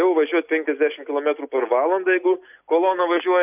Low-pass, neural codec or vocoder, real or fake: 3.6 kHz; none; real